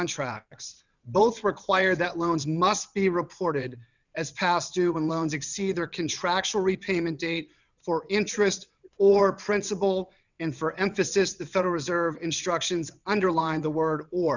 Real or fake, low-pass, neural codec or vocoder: fake; 7.2 kHz; vocoder, 22.05 kHz, 80 mel bands, WaveNeXt